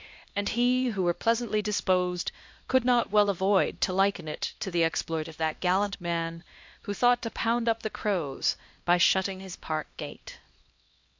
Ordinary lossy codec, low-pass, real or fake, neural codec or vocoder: MP3, 48 kbps; 7.2 kHz; fake; codec, 16 kHz, 1 kbps, X-Codec, HuBERT features, trained on LibriSpeech